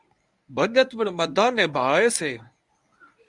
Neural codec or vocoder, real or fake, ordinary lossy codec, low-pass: codec, 24 kHz, 0.9 kbps, WavTokenizer, medium speech release version 1; fake; Opus, 64 kbps; 10.8 kHz